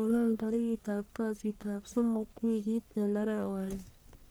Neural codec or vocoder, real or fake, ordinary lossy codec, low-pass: codec, 44.1 kHz, 1.7 kbps, Pupu-Codec; fake; none; none